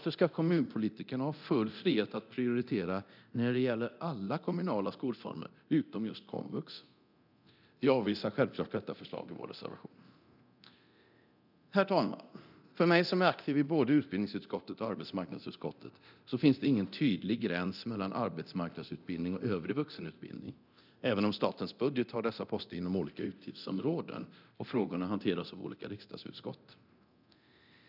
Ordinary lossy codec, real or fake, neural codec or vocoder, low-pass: none; fake; codec, 24 kHz, 0.9 kbps, DualCodec; 5.4 kHz